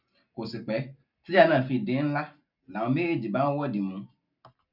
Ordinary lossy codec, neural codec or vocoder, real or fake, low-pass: none; none; real; 5.4 kHz